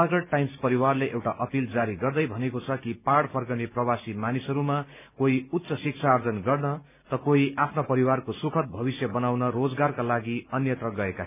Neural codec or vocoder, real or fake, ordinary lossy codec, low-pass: none; real; none; 3.6 kHz